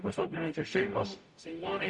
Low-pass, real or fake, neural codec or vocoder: 10.8 kHz; fake; codec, 44.1 kHz, 0.9 kbps, DAC